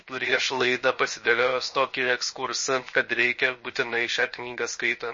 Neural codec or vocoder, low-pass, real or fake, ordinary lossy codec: codec, 16 kHz, 0.7 kbps, FocalCodec; 7.2 kHz; fake; MP3, 32 kbps